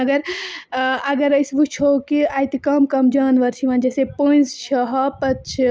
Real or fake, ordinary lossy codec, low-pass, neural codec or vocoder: real; none; none; none